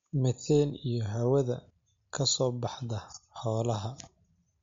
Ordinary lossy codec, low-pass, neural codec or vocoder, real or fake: MP3, 48 kbps; 7.2 kHz; none; real